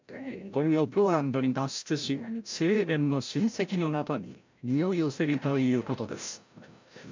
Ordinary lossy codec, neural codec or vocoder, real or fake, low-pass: MP3, 64 kbps; codec, 16 kHz, 0.5 kbps, FreqCodec, larger model; fake; 7.2 kHz